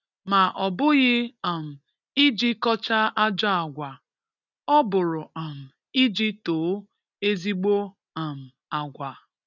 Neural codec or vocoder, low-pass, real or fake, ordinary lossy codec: none; 7.2 kHz; real; none